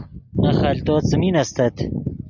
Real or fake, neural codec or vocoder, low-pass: real; none; 7.2 kHz